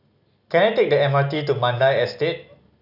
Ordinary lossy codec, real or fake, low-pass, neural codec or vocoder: none; real; 5.4 kHz; none